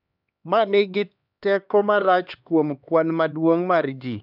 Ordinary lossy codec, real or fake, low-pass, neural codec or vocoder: none; fake; 5.4 kHz; codec, 16 kHz, 2 kbps, X-Codec, HuBERT features, trained on LibriSpeech